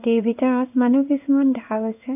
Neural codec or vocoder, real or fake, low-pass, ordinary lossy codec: codec, 16 kHz in and 24 kHz out, 1 kbps, XY-Tokenizer; fake; 3.6 kHz; none